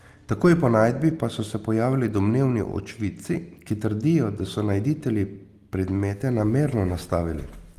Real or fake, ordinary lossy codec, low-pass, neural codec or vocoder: real; Opus, 24 kbps; 14.4 kHz; none